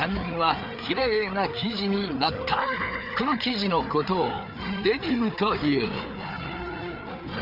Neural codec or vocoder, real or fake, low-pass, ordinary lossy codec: codec, 16 kHz, 4 kbps, FreqCodec, larger model; fake; 5.4 kHz; none